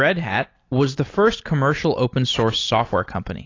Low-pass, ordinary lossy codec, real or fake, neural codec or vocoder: 7.2 kHz; AAC, 32 kbps; real; none